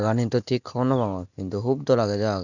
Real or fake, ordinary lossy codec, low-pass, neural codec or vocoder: real; none; 7.2 kHz; none